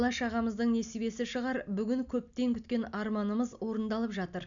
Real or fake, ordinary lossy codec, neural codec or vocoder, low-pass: real; none; none; 7.2 kHz